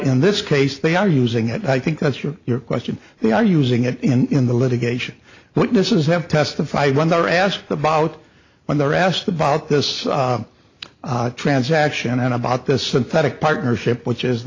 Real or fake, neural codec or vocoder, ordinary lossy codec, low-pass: real; none; AAC, 48 kbps; 7.2 kHz